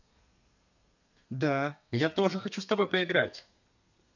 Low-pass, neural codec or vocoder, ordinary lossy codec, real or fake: 7.2 kHz; codec, 32 kHz, 1.9 kbps, SNAC; none; fake